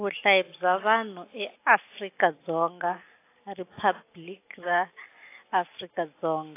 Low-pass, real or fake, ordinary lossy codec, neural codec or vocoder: 3.6 kHz; real; AAC, 24 kbps; none